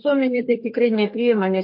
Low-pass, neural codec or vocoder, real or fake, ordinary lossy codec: 5.4 kHz; codec, 32 kHz, 1.9 kbps, SNAC; fake; MP3, 32 kbps